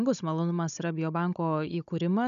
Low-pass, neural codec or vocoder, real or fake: 7.2 kHz; codec, 16 kHz, 16 kbps, FunCodec, trained on Chinese and English, 50 frames a second; fake